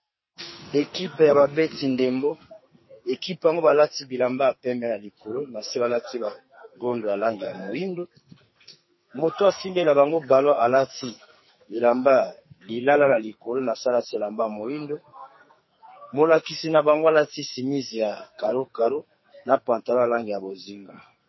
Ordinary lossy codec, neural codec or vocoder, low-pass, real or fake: MP3, 24 kbps; codec, 44.1 kHz, 2.6 kbps, SNAC; 7.2 kHz; fake